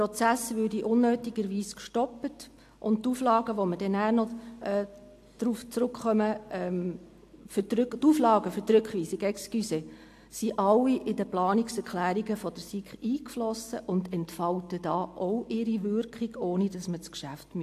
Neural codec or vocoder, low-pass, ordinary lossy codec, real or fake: none; 14.4 kHz; AAC, 64 kbps; real